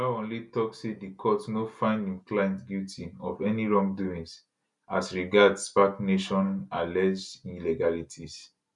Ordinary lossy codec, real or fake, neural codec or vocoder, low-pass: none; real; none; 10.8 kHz